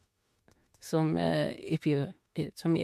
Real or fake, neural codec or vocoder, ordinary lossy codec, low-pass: fake; autoencoder, 48 kHz, 32 numbers a frame, DAC-VAE, trained on Japanese speech; MP3, 64 kbps; 14.4 kHz